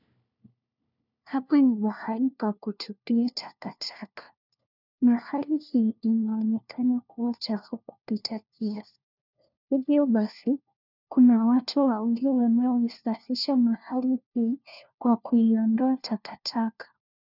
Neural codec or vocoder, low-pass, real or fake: codec, 16 kHz, 1 kbps, FunCodec, trained on LibriTTS, 50 frames a second; 5.4 kHz; fake